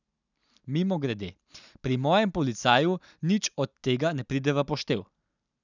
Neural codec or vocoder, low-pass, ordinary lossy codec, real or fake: none; 7.2 kHz; none; real